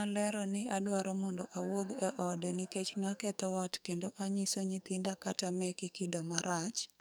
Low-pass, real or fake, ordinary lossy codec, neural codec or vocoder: none; fake; none; codec, 44.1 kHz, 2.6 kbps, SNAC